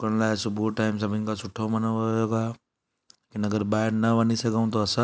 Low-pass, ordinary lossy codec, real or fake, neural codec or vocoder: none; none; real; none